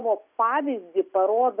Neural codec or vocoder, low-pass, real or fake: none; 3.6 kHz; real